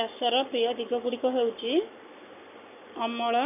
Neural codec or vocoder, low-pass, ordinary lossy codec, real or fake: codec, 44.1 kHz, 7.8 kbps, Pupu-Codec; 3.6 kHz; none; fake